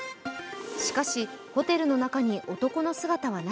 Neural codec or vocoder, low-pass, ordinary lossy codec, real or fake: none; none; none; real